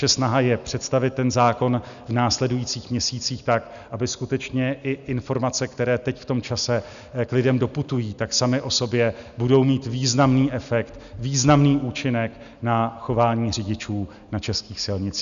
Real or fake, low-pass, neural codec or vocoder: real; 7.2 kHz; none